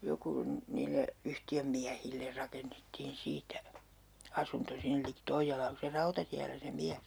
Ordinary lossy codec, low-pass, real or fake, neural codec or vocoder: none; none; real; none